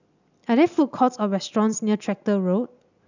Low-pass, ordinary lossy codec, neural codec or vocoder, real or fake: 7.2 kHz; none; none; real